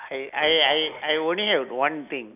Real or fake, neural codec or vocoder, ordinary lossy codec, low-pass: real; none; none; 3.6 kHz